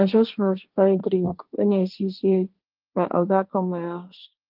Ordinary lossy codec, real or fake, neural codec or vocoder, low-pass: Opus, 24 kbps; fake; codec, 16 kHz, 1.1 kbps, Voila-Tokenizer; 5.4 kHz